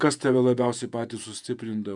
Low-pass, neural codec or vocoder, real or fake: 10.8 kHz; vocoder, 48 kHz, 128 mel bands, Vocos; fake